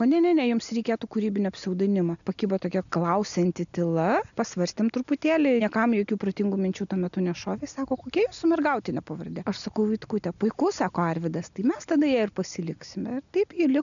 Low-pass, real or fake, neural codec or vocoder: 7.2 kHz; real; none